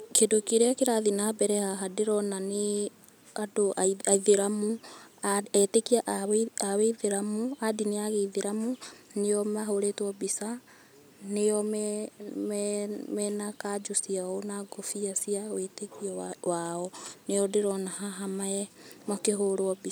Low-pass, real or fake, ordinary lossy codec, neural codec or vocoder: none; real; none; none